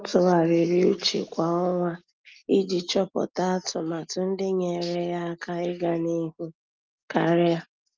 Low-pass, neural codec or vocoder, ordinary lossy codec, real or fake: 7.2 kHz; none; Opus, 32 kbps; real